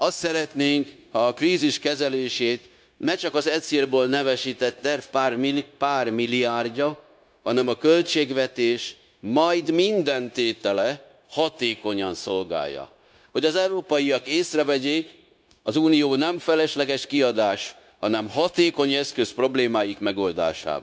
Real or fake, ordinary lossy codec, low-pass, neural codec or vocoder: fake; none; none; codec, 16 kHz, 0.9 kbps, LongCat-Audio-Codec